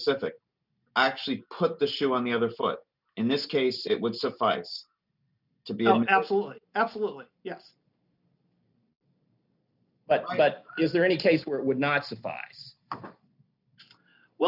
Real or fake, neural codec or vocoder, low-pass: real; none; 5.4 kHz